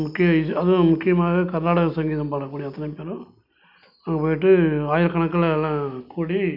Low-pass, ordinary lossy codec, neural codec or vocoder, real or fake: 5.4 kHz; none; none; real